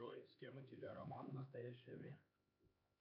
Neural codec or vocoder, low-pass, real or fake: codec, 16 kHz, 2 kbps, X-Codec, HuBERT features, trained on LibriSpeech; 5.4 kHz; fake